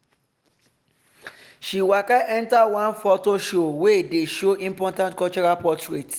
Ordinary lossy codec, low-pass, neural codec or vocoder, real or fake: Opus, 24 kbps; 19.8 kHz; none; real